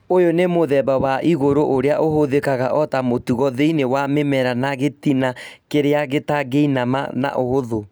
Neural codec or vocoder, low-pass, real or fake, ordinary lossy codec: none; none; real; none